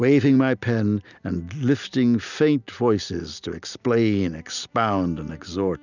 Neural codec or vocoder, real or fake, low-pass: none; real; 7.2 kHz